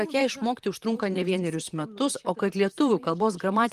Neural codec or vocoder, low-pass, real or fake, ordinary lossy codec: vocoder, 44.1 kHz, 128 mel bands, Pupu-Vocoder; 14.4 kHz; fake; Opus, 24 kbps